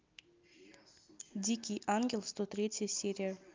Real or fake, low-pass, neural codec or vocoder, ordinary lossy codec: real; 7.2 kHz; none; Opus, 24 kbps